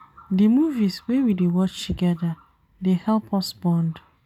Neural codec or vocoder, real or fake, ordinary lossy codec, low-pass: none; real; none; 19.8 kHz